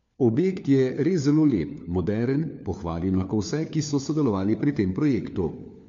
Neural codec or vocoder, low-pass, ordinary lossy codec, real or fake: codec, 16 kHz, 4 kbps, FunCodec, trained on LibriTTS, 50 frames a second; 7.2 kHz; MP3, 48 kbps; fake